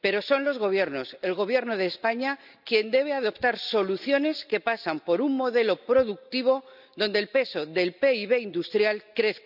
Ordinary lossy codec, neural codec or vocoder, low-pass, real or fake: none; none; 5.4 kHz; real